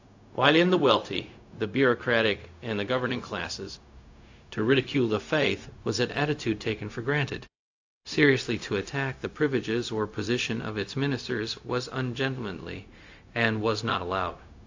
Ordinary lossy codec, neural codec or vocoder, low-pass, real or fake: AAC, 48 kbps; codec, 16 kHz, 0.4 kbps, LongCat-Audio-Codec; 7.2 kHz; fake